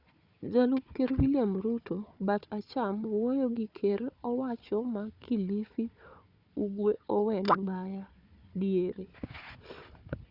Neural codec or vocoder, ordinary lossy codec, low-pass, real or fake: codec, 16 kHz, 4 kbps, FunCodec, trained on Chinese and English, 50 frames a second; Opus, 64 kbps; 5.4 kHz; fake